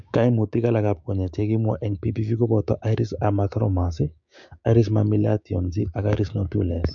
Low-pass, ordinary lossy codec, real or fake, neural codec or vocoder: 7.2 kHz; MP3, 48 kbps; fake; codec, 16 kHz, 6 kbps, DAC